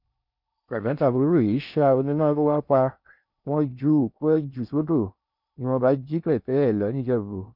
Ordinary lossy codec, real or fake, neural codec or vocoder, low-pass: none; fake; codec, 16 kHz in and 24 kHz out, 0.6 kbps, FocalCodec, streaming, 4096 codes; 5.4 kHz